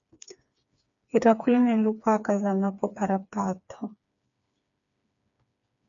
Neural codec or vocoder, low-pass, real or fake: codec, 16 kHz, 4 kbps, FreqCodec, smaller model; 7.2 kHz; fake